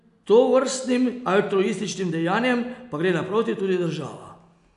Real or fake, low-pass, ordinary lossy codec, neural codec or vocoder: real; 10.8 kHz; none; none